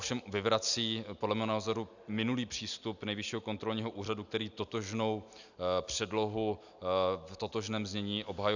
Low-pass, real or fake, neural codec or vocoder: 7.2 kHz; real; none